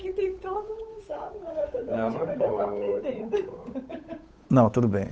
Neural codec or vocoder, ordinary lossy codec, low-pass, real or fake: codec, 16 kHz, 8 kbps, FunCodec, trained on Chinese and English, 25 frames a second; none; none; fake